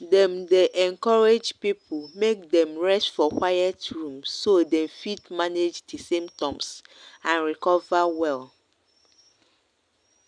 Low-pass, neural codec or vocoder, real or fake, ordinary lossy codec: 9.9 kHz; none; real; none